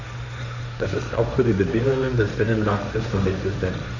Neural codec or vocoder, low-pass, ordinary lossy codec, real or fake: codec, 16 kHz, 1.1 kbps, Voila-Tokenizer; 7.2 kHz; none; fake